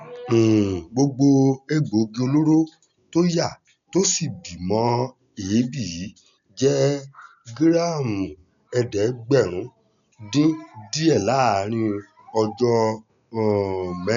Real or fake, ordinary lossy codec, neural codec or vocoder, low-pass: real; none; none; 7.2 kHz